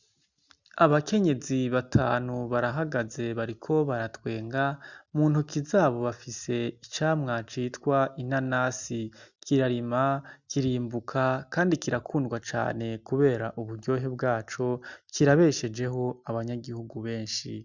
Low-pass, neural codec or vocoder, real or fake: 7.2 kHz; none; real